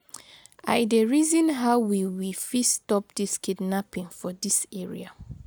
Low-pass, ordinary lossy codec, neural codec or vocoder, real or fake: none; none; none; real